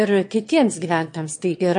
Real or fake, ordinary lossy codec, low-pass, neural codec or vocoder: fake; MP3, 48 kbps; 9.9 kHz; autoencoder, 22.05 kHz, a latent of 192 numbers a frame, VITS, trained on one speaker